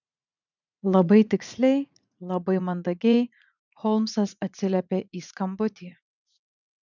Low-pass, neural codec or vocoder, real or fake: 7.2 kHz; vocoder, 44.1 kHz, 80 mel bands, Vocos; fake